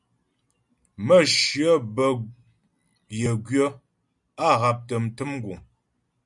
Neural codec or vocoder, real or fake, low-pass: none; real; 10.8 kHz